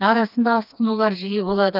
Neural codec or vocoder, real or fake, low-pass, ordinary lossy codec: codec, 16 kHz, 2 kbps, FreqCodec, smaller model; fake; 5.4 kHz; none